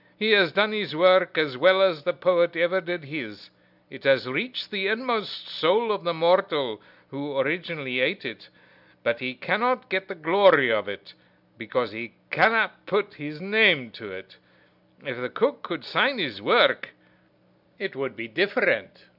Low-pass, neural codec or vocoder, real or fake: 5.4 kHz; none; real